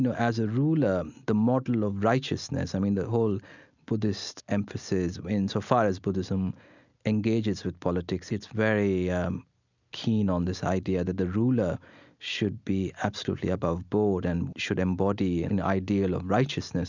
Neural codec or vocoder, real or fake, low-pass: none; real; 7.2 kHz